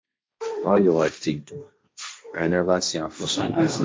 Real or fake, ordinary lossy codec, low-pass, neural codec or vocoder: fake; none; none; codec, 16 kHz, 1.1 kbps, Voila-Tokenizer